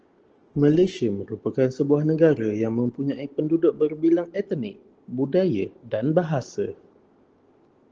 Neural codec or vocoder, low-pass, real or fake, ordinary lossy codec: none; 7.2 kHz; real; Opus, 16 kbps